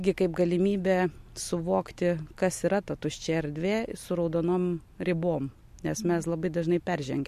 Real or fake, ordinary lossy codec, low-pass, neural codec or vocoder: real; MP3, 64 kbps; 14.4 kHz; none